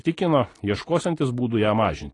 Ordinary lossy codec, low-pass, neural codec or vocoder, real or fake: AAC, 32 kbps; 10.8 kHz; none; real